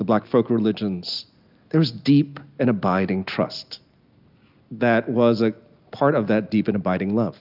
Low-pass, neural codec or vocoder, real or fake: 5.4 kHz; none; real